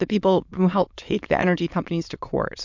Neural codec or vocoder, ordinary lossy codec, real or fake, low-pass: autoencoder, 22.05 kHz, a latent of 192 numbers a frame, VITS, trained on many speakers; MP3, 64 kbps; fake; 7.2 kHz